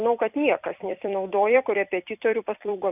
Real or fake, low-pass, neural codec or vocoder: fake; 3.6 kHz; vocoder, 44.1 kHz, 128 mel bands every 256 samples, BigVGAN v2